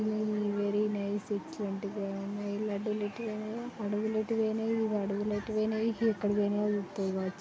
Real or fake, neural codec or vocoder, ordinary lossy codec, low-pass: real; none; none; none